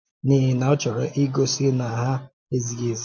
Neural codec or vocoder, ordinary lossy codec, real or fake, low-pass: none; none; real; none